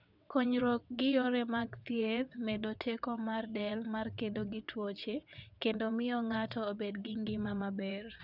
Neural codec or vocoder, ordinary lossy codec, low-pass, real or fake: vocoder, 22.05 kHz, 80 mel bands, WaveNeXt; none; 5.4 kHz; fake